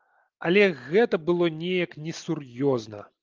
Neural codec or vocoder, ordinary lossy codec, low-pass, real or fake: none; Opus, 24 kbps; 7.2 kHz; real